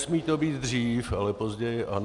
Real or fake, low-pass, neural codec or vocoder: real; 10.8 kHz; none